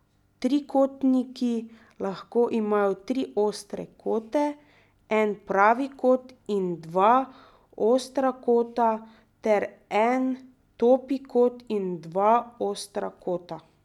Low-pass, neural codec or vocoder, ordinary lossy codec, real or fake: 19.8 kHz; none; none; real